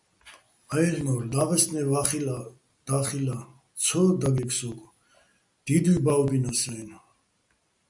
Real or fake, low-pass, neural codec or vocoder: real; 10.8 kHz; none